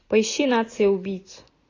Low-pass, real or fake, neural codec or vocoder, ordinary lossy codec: 7.2 kHz; real; none; AAC, 32 kbps